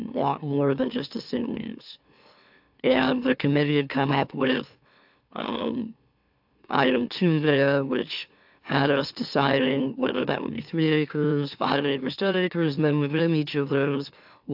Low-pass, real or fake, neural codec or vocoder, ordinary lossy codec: 5.4 kHz; fake; autoencoder, 44.1 kHz, a latent of 192 numbers a frame, MeloTTS; AAC, 48 kbps